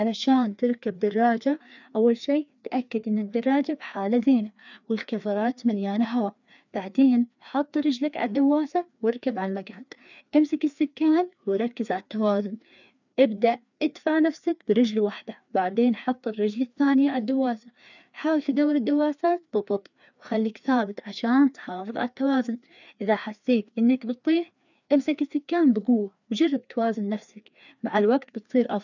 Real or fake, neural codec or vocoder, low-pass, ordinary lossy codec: fake; codec, 16 kHz, 2 kbps, FreqCodec, larger model; 7.2 kHz; none